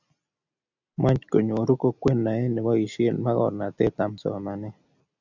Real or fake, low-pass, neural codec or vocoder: real; 7.2 kHz; none